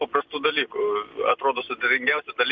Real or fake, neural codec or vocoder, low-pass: real; none; 7.2 kHz